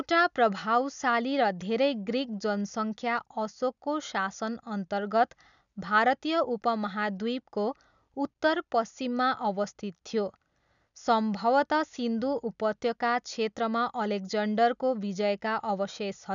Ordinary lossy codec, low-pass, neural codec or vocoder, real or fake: none; 7.2 kHz; none; real